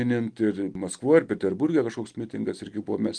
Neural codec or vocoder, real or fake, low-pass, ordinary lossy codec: none; real; 9.9 kHz; Opus, 24 kbps